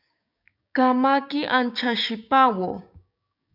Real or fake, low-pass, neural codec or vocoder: fake; 5.4 kHz; codec, 16 kHz, 6 kbps, DAC